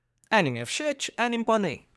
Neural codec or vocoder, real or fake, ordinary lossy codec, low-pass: codec, 24 kHz, 1 kbps, SNAC; fake; none; none